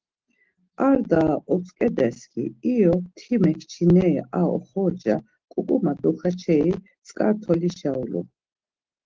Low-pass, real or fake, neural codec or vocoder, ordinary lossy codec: 7.2 kHz; real; none; Opus, 16 kbps